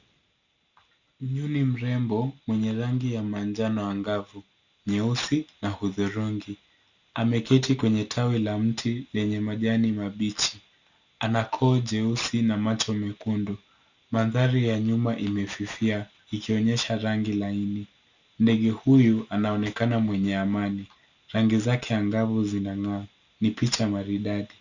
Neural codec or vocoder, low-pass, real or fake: none; 7.2 kHz; real